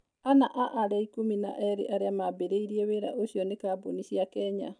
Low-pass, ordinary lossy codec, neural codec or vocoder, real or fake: 9.9 kHz; none; none; real